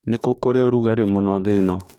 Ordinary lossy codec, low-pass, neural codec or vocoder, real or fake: none; 19.8 kHz; codec, 44.1 kHz, 2.6 kbps, DAC; fake